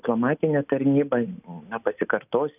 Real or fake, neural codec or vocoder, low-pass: real; none; 3.6 kHz